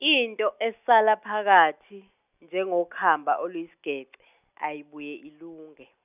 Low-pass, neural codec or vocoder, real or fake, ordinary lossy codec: 3.6 kHz; none; real; none